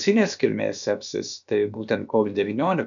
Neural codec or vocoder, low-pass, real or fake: codec, 16 kHz, about 1 kbps, DyCAST, with the encoder's durations; 7.2 kHz; fake